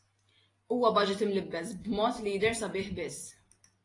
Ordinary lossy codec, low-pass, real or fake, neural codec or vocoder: AAC, 32 kbps; 10.8 kHz; real; none